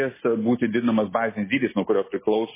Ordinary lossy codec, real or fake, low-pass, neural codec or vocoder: MP3, 16 kbps; real; 3.6 kHz; none